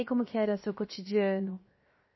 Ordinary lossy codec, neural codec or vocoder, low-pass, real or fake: MP3, 24 kbps; codec, 16 kHz, 0.7 kbps, FocalCodec; 7.2 kHz; fake